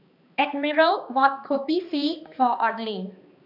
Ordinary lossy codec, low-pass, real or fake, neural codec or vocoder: none; 5.4 kHz; fake; codec, 16 kHz, 2 kbps, X-Codec, HuBERT features, trained on balanced general audio